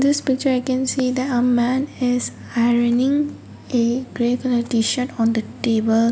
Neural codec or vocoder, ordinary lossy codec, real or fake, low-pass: none; none; real; none